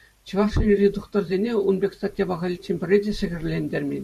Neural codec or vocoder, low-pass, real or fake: vocoder, 44.1 kHz, 128 mel bands every 512 samples, BigVGAN v2; 14.4 kHz; fake